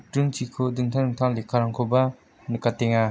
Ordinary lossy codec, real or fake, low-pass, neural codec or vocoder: none; real; none; none